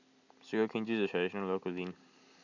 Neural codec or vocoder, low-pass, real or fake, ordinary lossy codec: none; 7.2 kHz; real; none